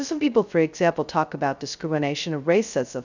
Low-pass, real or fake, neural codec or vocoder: 7.2 kHz; fake; codec, 16 kHz, 0.2 kbps, FocalCodec